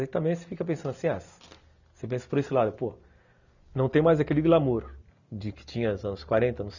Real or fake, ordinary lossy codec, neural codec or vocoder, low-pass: real; none; none; 7.2 kHz